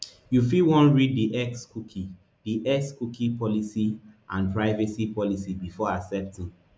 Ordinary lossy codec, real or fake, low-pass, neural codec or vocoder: none; real; none; none